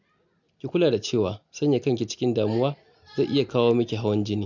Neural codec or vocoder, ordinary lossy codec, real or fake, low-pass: none; none; real; 7.2 kHz